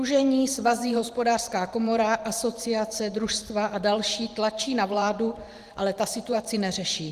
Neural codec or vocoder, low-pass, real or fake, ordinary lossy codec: vocoder, 44.1 kHz, 128 mel bands every 512 samples, BigVGAN v2; 14.4 kHz; fake; Opus, 24 kbps